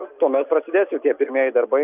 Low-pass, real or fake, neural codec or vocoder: 3.6 kHz; real; none